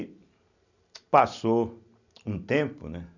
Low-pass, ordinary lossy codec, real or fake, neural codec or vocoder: 7.2 kHz; none; real; none